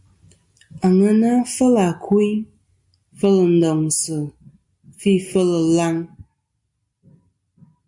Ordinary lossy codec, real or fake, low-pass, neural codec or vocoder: MP3, 64 kbps; real; 10.8 kHz; none